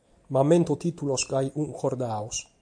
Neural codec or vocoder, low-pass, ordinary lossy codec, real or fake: none; 9.9 kHz; AAC, 64 kbps; real